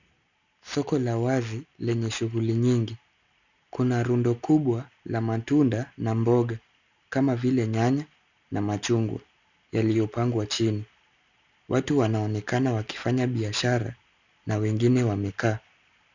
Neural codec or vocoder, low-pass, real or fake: none; 7.2 kHz; real